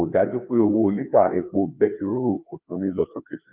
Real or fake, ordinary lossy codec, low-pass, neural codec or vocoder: fake; Opus, 32 kbps; 3.6 kHz; codec, 16 kHz, 2 kbps, FreqCodec, larger model